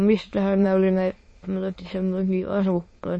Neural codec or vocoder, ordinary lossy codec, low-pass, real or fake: autoencoder, 22.05 kHz, a latent of 192 numbers a frame, VITS, trained on many speakers; MP3, 32 kbps; 9.9 kHz; fake